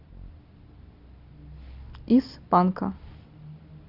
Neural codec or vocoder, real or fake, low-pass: none; real; 5.4 kHz